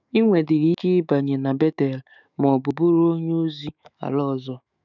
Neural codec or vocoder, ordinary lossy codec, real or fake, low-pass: autoencoder, 48 kHz, 128 numbers a frame, DAC-VAE, trained on Japanese speech; none; fake; 7.2 kHz